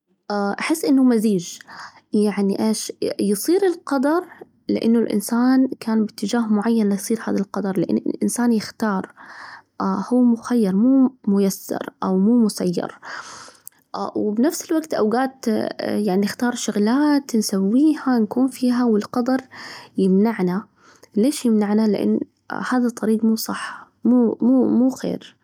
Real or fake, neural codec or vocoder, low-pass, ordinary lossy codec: real; none; 19.8 kHz; none